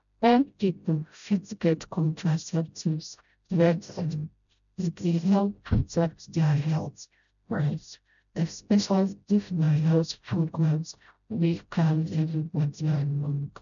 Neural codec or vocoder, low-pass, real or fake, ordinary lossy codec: codec, 16 kHz, 0.5 kbps, FreqCodec, smaller model; 7.2 kHz; fake; none